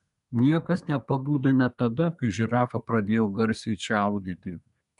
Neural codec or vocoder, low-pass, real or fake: codec, 24 kHz, 1 kbps, SNAC; 10.8 kHz; fake